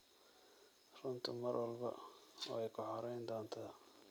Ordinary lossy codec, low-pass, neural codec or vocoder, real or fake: none; none; none; real